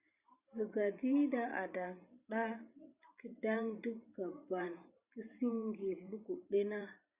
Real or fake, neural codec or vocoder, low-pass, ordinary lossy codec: fake; vocoder, 44.1 kHz, 128 mel bands every 512 samples, BigVGAN v2; 3.6 kHz; Opus, 64 kbps